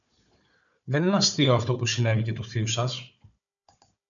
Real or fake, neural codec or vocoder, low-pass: fake; codec, 16 kHz, 4 kbps, FunCodec, trained on Chinese and English, 50 frames a second; 7.2 kHz